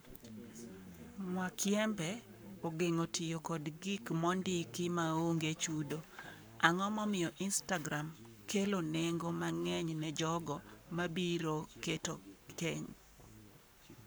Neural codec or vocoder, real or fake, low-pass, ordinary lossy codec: codec, 44.1 kHz, 7.8 kbps, Pupu-Codec; fake; none; none